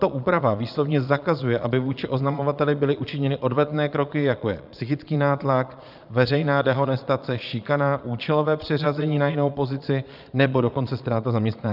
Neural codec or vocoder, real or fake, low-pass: vocoder, 22.05 kHz, 80 mel bands, Vocos; fake; 5.4 kHz